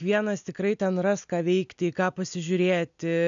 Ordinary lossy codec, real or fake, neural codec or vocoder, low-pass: MP3, 96 kbps; real; none; 7.2 kHz